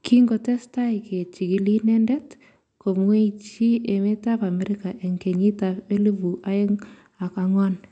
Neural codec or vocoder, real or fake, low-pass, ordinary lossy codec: none; real; 9.9 kHz; none